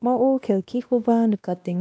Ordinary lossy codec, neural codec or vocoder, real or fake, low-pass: none; codec, 16 kHz, 1 kbps, X-Codec, WavLM features, trained on Multilingual LibriSpeech; fake; none